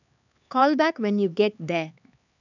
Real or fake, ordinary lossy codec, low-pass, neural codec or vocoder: fake; none; 7.2 kHz; codec, 16 kHz, 4 kbps, X-Codec, HuBERT features, trained on LibriSpeech